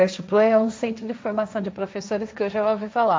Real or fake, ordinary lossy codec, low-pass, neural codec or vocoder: fake; none; none; codec, 16 kHz, 1.1 kbps, Voila-Tokenizer